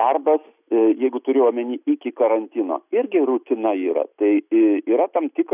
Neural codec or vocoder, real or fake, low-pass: none; real; 3.6 kHz